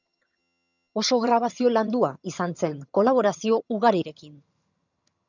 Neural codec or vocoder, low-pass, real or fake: vocoder, 22.05 kHz, 80 mel bands, HiFi-GAN; 7.2 kHz; fake